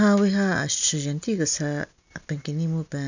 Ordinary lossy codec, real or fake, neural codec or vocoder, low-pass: none; real; none; 7.2 kHz